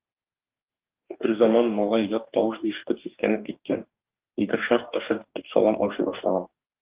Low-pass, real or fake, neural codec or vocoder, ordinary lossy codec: 3.6 kHz; fake; codec, 44.1 kHz, 2.6 kbps, DAC; Opus, 32 kbps